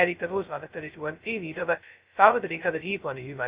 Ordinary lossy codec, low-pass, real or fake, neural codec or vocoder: Opus, 64 kbps; 3.6 kHz; fake; codec, 16 kHz, 0.2 kbps, FocalCodec